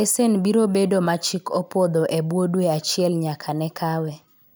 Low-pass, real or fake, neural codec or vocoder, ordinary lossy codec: none; real; none; none